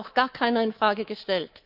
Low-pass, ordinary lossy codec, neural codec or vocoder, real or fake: 5.4 kHz; Opus, 24 kbps; codec, 24 kHz, 3.1 kbps, DualCodec; fake